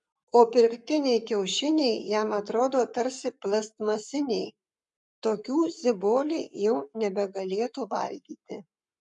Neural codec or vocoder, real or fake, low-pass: codec, 44.1 kHz, 7.8 kbps, Pupu-Codec; fake; 10.8 kHz